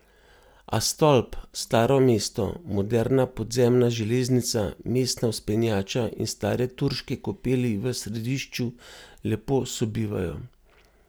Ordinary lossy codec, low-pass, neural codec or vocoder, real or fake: none; none; none; real